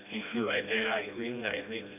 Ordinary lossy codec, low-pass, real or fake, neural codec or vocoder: none; 3.6 kHz; fake; codec, 16 kHz, 1 kbps, FreqCodec, smaller model